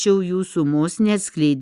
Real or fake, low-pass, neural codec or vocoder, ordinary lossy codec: real; 10.8 kHz; none; Opus, 64 kbps